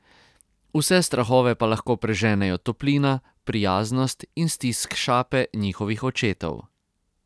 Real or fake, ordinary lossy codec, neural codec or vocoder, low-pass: real; none; none; none